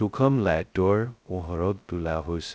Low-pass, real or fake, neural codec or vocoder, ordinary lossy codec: none; fake; codec, 16 kHz, 0.2 kbps, FocalCodec; none